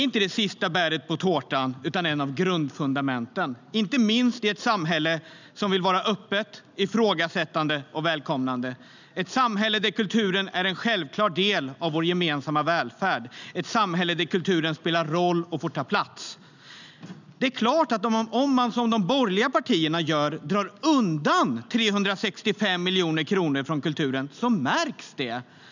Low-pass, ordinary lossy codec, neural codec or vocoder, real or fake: 7.2 kHz; none; none; real